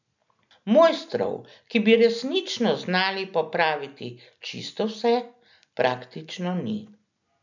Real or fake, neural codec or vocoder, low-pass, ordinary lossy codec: real; none; 7.2 kHz; none